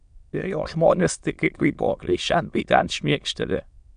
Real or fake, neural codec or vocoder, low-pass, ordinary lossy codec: fake; autoencoder, 22.05 kHz, a latent of 192 numbers a frame, VITS, trained on many speakers; 9.9 kHz; MP3, 96 kbps